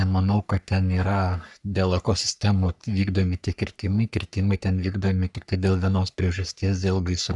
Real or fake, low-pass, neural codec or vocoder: fake; 10.8 kHz; codec, 44.1 kHz, 3.4 kbps, Pupu-Codec